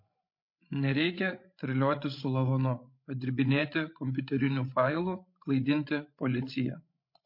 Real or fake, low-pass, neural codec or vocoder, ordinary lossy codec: fake; 5.4 kHz; codec, 16 kHz, 16 kbps, FreqCodec, larger model; MP3, 32 kbps